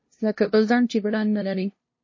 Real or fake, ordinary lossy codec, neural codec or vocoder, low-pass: fake; MP3, 32 kbps; codec, 16 kHz, 0.5 kbps, FunCodec, trained on LibriTTS, 25 frames a second; 7.2 kHz